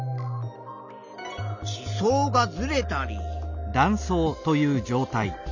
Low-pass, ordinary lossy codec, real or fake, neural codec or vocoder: 7.2 kHz; none; real; none